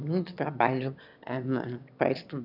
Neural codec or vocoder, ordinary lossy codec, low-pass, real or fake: autoencoder, 22.05 kHz, a latent of 192 numbers a frame, VITS, trained on one speaker; none; 5.4 kHz; fake